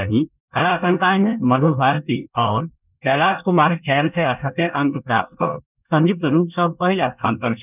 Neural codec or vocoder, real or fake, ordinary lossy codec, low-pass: codec, 24 kHz, 1 kbps, SNAC; fake; none; 3.6 kHz